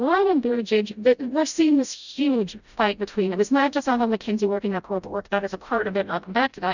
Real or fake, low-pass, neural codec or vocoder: fake; 7.2 kHz; codec, 16 kHz, 0.5 kbps, FreqCodec, smaller model